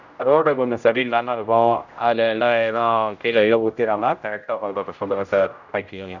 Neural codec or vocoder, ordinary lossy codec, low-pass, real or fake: codec, 16 kHz, 0.5 kbps, X-Codec, HuBERT features, trained on general audio; none; 7.2 kHz; fake